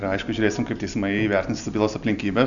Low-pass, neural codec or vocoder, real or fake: 7.2 kHz; none; real